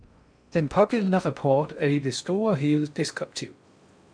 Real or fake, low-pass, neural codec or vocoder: fake; 9.9 kHz; codec, 16 kHz in and 24 kHz out, 0.6 kbps, FocalCodec, streaming, 4096 codes